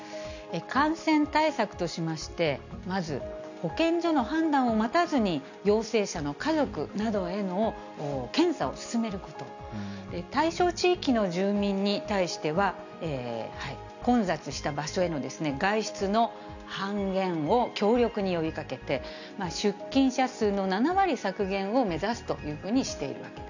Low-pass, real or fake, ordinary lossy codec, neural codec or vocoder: 7.2 kHz; real; none; none